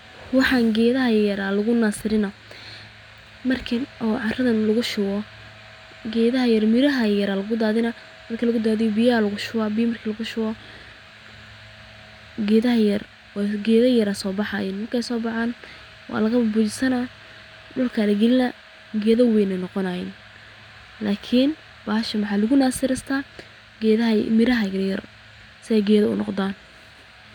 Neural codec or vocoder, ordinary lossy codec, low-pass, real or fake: none; none; 19.8 kHz; real